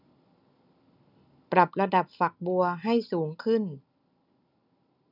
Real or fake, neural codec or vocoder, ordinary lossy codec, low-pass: real; none; none; 5.4 kHz